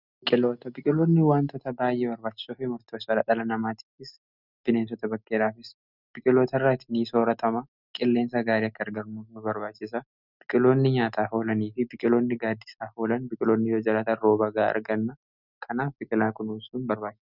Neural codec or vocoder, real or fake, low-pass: none; real; 5.4 kHz